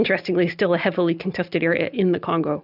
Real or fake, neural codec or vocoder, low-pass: real; none; 5.4 kHz